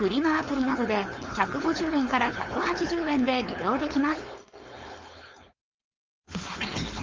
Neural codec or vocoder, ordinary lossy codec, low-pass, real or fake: codec, 16 kHz, 4.8 kbps, FACodec; Opus, 32 kbps; 7.2 kHz; fake